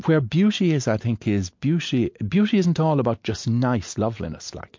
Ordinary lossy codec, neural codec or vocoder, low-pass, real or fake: MP3, 48 kbps; none; 7.2 kHz; real